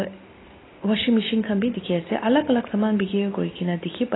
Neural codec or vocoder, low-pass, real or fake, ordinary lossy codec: none; 7.2 kHz; real; AAC, 16 kbps